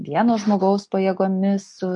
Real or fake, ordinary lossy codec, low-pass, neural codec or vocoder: real; MP3, 48 kbps; 10.8 kHz; none